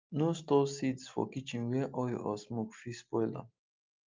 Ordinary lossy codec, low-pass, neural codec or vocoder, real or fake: Opus, 32 kbps; 7.2 kHz; none; real